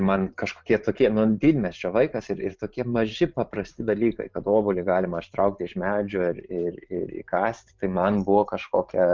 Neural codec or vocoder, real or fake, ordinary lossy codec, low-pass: vocoder, 44.1 kHz, 128 mel bands every 512 samples, BigVGAN v2; fake; Opus, 24 kbps; 7.2 kHz